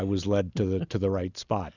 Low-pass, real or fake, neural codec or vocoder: 7.2 kHz; real; none